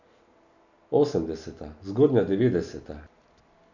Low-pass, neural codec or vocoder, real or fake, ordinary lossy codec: 7.2 kHz; none; real; none